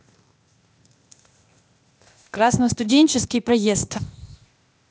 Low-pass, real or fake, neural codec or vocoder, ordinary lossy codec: none; fake; codec, 16 kHz, 0.8 kbps, ZipCodec; none